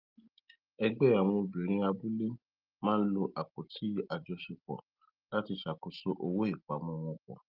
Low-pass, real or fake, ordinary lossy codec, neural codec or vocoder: 5.4 kHz; real; Opus, 32 kbps; none